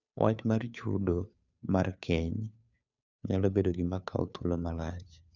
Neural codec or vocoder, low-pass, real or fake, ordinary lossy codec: codec, 16 kHz, 2 kbps, FunCodec, trained on Chinese and English, 25 frames a second; 7.2 kHz; fake; none